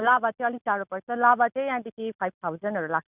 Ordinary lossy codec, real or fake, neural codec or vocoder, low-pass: none; real; none; 3.6 kHz